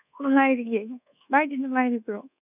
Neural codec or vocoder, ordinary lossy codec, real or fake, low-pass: codec, 24 kHz, 1.2 kbps, DualCodec; none; fake; 3.6 kHz